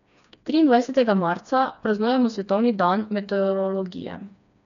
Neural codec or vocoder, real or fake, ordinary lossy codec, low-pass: codec, 16 kHz, 2 kbps, FreqCodec, smaller model; fake; none; 7.2 kHz